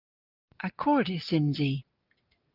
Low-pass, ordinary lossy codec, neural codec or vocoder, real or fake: 5.4 kHz; Opus, 32 kbps; none; real